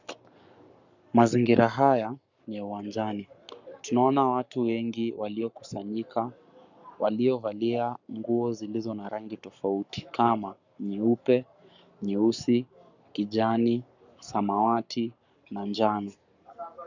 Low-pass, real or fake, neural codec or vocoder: 7.2 kHz; fake; codec, 44.1 kHz, 7.8 kbps, Pupu-Codec